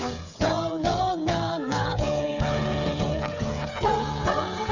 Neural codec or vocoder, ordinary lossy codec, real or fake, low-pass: codec, 16 kHz, 8 kbps, FreqCodec, smaller model; none; fake; 7.2 kHz